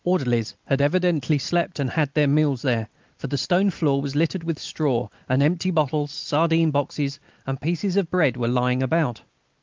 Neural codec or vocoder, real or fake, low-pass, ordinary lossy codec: none; real; 7.2 kHz; Opus, 32 kbps